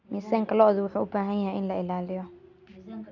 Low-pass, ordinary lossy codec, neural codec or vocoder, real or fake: 7.2 kHz; none; none; real